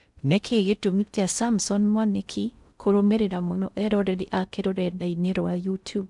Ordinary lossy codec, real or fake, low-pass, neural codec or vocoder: none; fake; 10.8 kHz; codec, 16 kHz in and 24 kHz out, 0.6 kbps, FocalCodec, streaming, 2048 codes